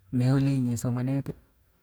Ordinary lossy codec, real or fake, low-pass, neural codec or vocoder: none; fake; none; codec, 44.1 kHz, 2.6 kbps, DAC